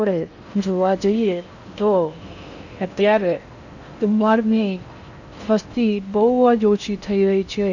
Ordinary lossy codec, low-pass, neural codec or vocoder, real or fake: none; 7.2 kHz; codec, 16 kHz in and 24 kHz out, 0.6 kbps, FocalCodec, streaming, 4096 codes; fake